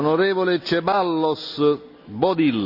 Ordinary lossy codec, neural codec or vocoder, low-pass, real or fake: none; none; 5.4 kHz; real